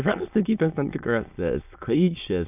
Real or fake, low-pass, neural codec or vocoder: fake; 3.6 kHz; autoencoder, 22.05 kHz, a latent of 192 numbers a frame, VITS, trained on many speakers